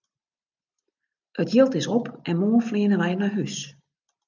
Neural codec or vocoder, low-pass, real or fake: none; 7.2 kHz; real